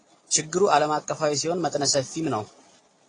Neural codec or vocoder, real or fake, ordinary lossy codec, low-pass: none; real; AAC, 32 kbps; 9.9 kHz